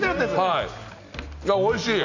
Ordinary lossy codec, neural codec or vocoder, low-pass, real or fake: none; none; 7.2 kHz; real